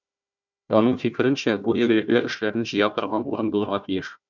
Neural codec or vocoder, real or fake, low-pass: codec, 16 kHz, 1 kbps, FunCodec, trained on Chinese and English, 50 frames a second; fake; 7.2 kHz